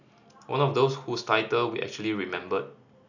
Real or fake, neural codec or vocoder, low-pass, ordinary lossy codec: real; none; 7.2 kHz; none